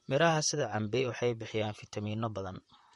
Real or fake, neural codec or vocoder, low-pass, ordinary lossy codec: fake; vocoder, 44.1 kHz, 128 mel bands, Pupu-Vocoder; 19.8 kHz; MP3, 48 kbps